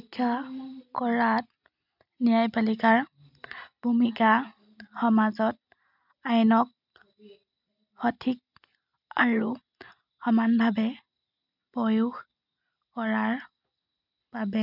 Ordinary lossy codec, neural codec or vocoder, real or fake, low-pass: none; none; real; 5.4 kHz